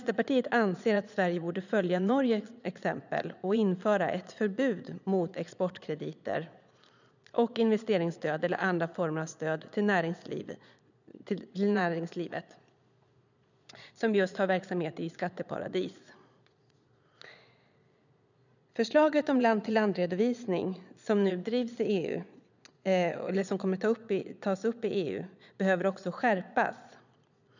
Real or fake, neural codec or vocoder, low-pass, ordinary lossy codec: fake; vocoder, 22.05 kHz, 80 mel bands, Vocos; 7.2 kHz; none